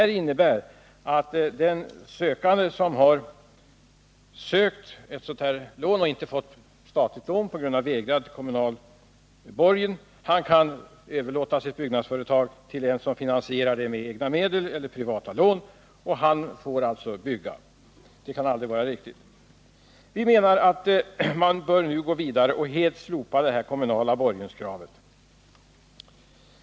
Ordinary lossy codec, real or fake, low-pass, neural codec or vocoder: none; real; none; none